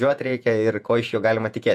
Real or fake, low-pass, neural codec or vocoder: fake; 14.4 kHz; vocoder, 48 kHz, 128 mel bands, Vocos